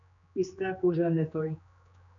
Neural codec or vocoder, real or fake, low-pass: codec, 16 kHz, 2 kbps, X-Codec, HuBERT features, trained on general audio; fake; 7.2 kHz